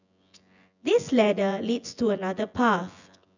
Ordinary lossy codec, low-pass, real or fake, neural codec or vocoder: none; 7.2 kHz; fake; vocoder, 24 kHz, 100 mel bands, Vocos